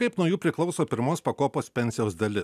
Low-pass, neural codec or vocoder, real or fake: 14.4 kHz; none; real